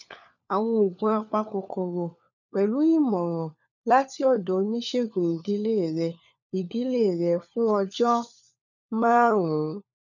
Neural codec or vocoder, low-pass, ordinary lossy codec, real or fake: codec, 16 kHz, 4 kbps, FunCodec, trained on LibriTTS, 50 frames a second; 7.2 kHz; none; fake